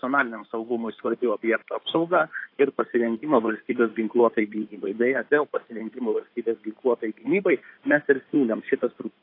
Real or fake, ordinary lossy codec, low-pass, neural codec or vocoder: fake; AAC, 32 kbps; 5.4 kHz; codec, 16 kHz in and 24 kHz out, 2.2 kbps, FireRedTTS-2 codec